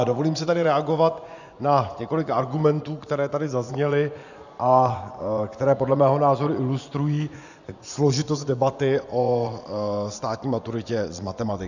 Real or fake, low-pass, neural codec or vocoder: fake; 7.2 kHz; vocoder, 24 kHz, 100 mel bands, Vocos